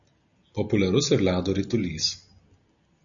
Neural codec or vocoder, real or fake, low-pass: none; real; 7.2 kHz